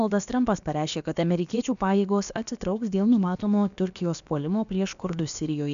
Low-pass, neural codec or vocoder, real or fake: 7.2 kHz; codec, 16 kHz, about 1 kbps, DyCAST, with the encoder's durations; fake